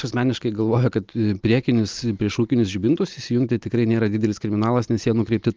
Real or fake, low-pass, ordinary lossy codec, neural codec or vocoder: real; 7.2 kHz; Opus, 24 kbps; none